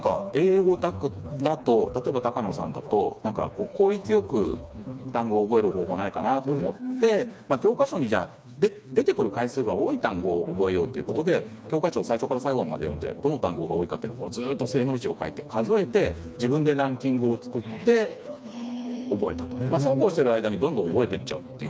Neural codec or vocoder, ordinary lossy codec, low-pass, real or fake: codec, 16 kHz, 2 kbps, FreqCodec, smaller model; none; none; fake